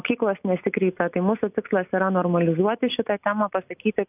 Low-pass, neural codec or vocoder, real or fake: 3.6 kHz; none; real